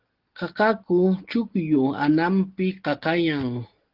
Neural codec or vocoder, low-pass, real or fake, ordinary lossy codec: none; 5.4 kHz; real; Opus, 16 kbps